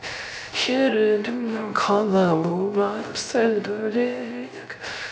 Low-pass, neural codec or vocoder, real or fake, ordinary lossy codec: none; codec, 16 kHz, 0.3 kbps, FocalCodec; fake; none